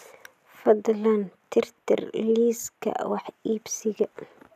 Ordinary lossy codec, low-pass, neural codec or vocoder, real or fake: none; 14.4 kHz; none; real